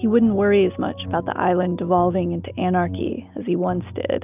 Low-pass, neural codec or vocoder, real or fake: 3.6 kHz; none; real